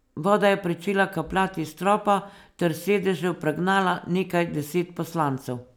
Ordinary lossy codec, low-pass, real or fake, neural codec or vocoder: none; none; real; none